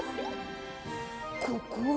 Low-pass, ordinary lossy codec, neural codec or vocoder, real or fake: none; none; none; real